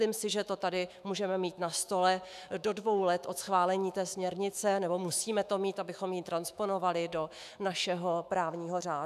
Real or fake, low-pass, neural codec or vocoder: fake; 14.4 kHz; autoencoder, 48 kHz, 128 numbers a frame, DAC-VAE, trained on Japanese speech